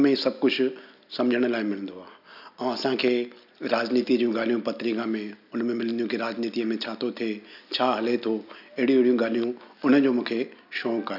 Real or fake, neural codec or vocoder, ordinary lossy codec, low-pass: real; none; none; 5.4 kHz